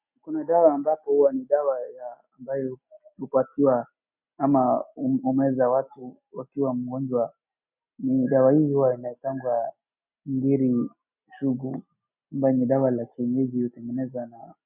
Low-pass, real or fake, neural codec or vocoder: 3.6 kHz; real; none